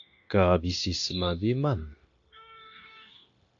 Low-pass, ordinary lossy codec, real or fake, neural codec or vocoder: 7.2 kHz; AAC, 48 kbps; fake; codec, 16 kHz, 0.9 kbps, LongCat-Audio-Codec